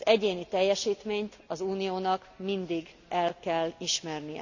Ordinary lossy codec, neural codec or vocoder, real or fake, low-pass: none; none; real; 7.2 kHz